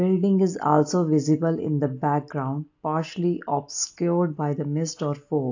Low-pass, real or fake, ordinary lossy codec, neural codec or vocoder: 7.2 kHz; real; AAC, 48 kbps; none